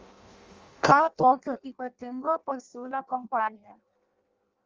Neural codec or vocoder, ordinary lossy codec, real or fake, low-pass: codec, 16 kHz in and 24 kHz out, 0.6 kbps, FireRedTTS-2 codec; Opus, 32 kbps; fake; 7.2 kHz